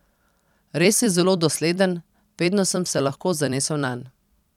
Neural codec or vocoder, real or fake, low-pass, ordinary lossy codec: vocoder, 44.1 kHz, 128 mel bands every 256 samples, BigVGAN v2; fake; 19.8 kHz; none